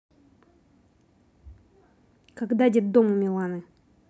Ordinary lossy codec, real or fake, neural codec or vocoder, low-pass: none; real; none; none